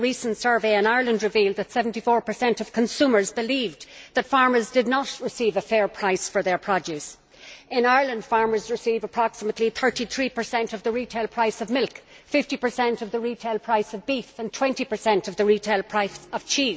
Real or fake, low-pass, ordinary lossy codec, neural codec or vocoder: real; none; none; none